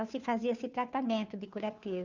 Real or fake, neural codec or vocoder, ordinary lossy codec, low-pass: fake; codec, 16 kHz, 2 kbps, FunCodec, trained on Chinese and English, 25 frames a second; Opus, 64 kbps; 7.2 kHz